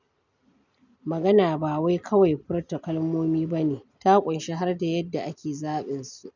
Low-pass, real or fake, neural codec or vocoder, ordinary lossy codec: 7.2 kHz; real; none; none